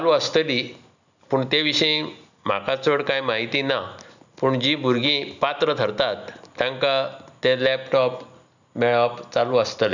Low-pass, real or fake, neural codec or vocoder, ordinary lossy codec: 7.2 kHz; real; none; none